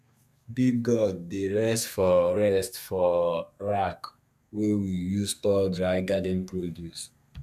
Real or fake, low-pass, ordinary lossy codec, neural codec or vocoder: fake; 14.4 kHz; none; codec, 32 kHz, 1.9 kbps, SNAC